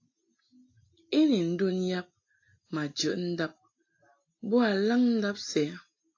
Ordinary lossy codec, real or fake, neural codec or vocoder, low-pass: AAC, 48 kbps; real; none; 7.2 kHz